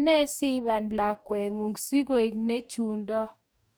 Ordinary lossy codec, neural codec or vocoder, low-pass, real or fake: none; codec, 44.1 kHz, 2.6 kbps, DAC; none; fake